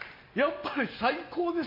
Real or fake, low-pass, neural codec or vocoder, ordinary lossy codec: real; 5.4 kHz; none; MP3, 32 kbps